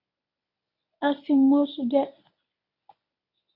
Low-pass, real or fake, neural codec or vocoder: 5.4 kHz; fake; codec, 24 kHz, 0.9 kbps, WavTokenizer, medium speech release version 1